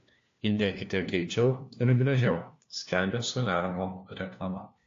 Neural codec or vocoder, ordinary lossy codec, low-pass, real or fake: codec, 16 kHz, 1 kbps, FunCodec, trained on LibriTTS, 50 frames a second; AAC, 48 kbps; 7.2 kHz; fake